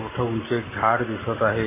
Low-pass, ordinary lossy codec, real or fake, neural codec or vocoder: 3.6 kHz; MP3, 16 kbps; real; none